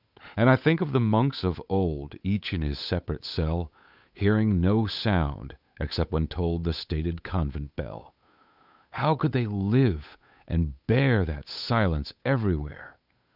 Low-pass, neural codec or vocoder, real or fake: 5.4 kHz; codec, 16 kHz, 8 kbps, FunCodec, trained on Chinese and English, 25 frames a second; fake